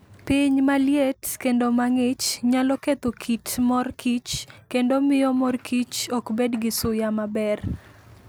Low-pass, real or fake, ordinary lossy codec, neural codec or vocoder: none; real; none; none